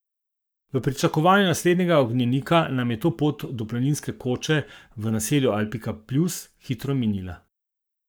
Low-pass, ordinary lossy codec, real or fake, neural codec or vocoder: none; none; fake; codec, 44.1 kHz, 7.8 kbps, Pupu-Codec